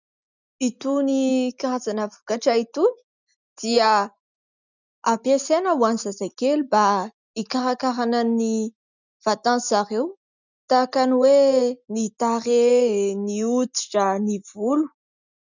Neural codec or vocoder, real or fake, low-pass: none; real; 7.2 kHz